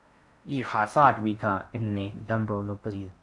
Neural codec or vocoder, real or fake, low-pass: codec, 16 kHz in and 24 kHz out, 0.6 kbps, FocalCodec, streaming, 4096 codes; fake; 10.8 kHz